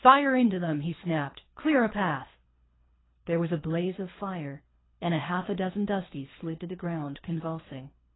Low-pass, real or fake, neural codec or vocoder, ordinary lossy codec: 7.2 kHz; fake; codec, 24 kHz, 3 kbps, HILCodec; AAC, 16 kbps